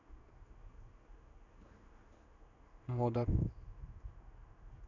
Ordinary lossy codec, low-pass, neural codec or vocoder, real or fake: none; 7.2 kHz; codec, 16 kHz in and 24 kHz out, 1 kbps, XY-Tokenizer; fake